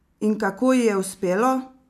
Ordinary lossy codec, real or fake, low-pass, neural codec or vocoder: none; real; 14.4 kHz; none